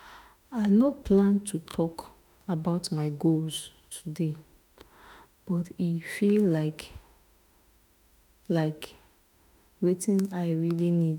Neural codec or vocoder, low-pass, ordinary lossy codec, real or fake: autoencoder, 48 kHz, 32 numbers a frame, DAC-VAE, trained on Japanese speech; 19.8 kHz; none; fake